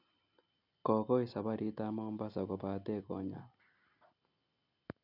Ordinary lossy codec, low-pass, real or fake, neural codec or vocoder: none; 5.4 kHz; real; none